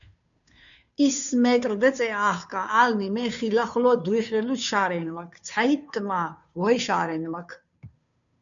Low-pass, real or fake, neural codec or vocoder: 7.2 kHz; fake; codec, 16 kHz, 2 kbps, FunCodec, trained on Chinese and English, 25 frames a second